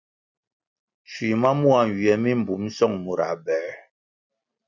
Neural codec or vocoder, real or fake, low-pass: none; real; 7.2 kHz